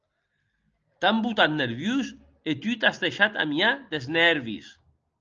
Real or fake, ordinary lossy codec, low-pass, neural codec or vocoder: real; Opus, 24 kbps; 7.2 kHz; none